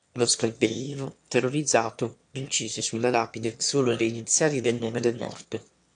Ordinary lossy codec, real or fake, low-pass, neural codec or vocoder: AAC, 64 kbps; fake; 9.9 kHz; autoencoder, 22.05 kHz, a latent of 192 numbers a frame, VITS, trained on one speaker